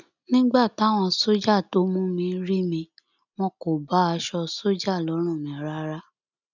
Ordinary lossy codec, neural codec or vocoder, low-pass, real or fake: none; none; 7.2 kHz; real